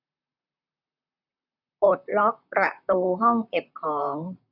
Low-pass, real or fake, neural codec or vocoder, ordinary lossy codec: 5.4 kHz; fake; vocoder, 44.1 kHz, 128 mel bands, Pupu-Vocoder; none